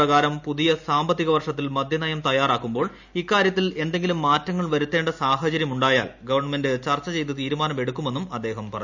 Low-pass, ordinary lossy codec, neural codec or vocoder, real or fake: 7.2 kHz; none; none; real